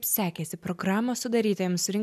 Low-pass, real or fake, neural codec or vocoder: 14.4 kHz; real; none